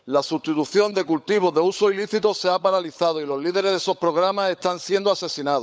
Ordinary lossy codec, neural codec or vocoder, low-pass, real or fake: none; codec, 16 kHz, 16 kbps, FunCodec, trained on LibriTTS, 50 frames a second; none; fake